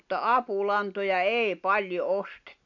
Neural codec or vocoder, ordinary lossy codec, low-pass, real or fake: none; none; 7.2 kHz; real